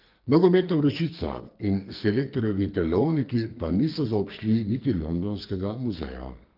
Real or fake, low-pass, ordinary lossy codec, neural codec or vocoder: fake; 5.4 kHz; Opus, 32 kbps; codec, 44.1 kHz, 2.6 kbps, SNAC